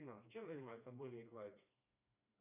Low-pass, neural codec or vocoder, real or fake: 3.6 kHz; codec, 16 kHz, 2 kbps, FreqCodec, smaller model; fake